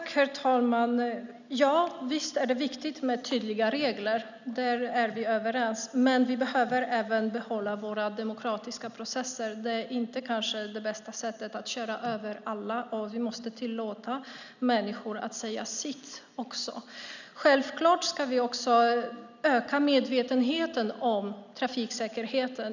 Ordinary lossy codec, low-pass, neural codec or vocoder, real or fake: none; 7.2 kHz; none; real